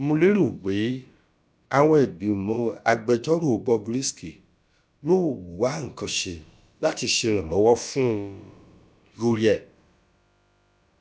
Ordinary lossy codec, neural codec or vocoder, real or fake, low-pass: none; codec, 16 kHz, about 1 kbps, DyCAST, with the encoder's durations; fake; none